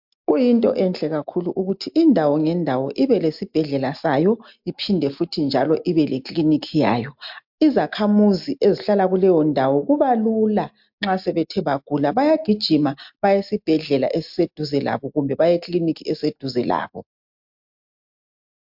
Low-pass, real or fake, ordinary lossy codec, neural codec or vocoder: 5.4 kHz; real; MP3, 48 kbps; none